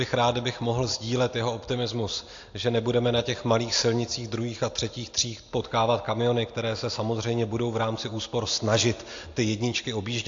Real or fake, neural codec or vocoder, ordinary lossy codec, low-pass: real; none; AAC, 48 kbps; 7.2 kHz